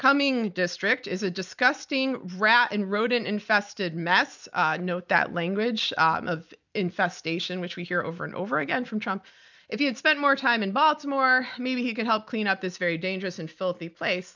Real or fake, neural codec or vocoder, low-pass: real; none; 7.2 kHz